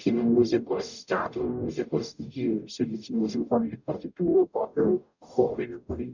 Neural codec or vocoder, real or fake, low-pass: codec, 44.1 kHz, 0.9 kbps, DAC; fake; 7.2 kHz